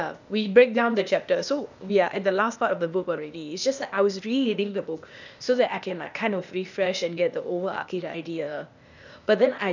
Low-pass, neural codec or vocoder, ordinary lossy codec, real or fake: 7.2 kHz; codec, 16 kHz, 0.8 kbps, ZipCodec; none; fake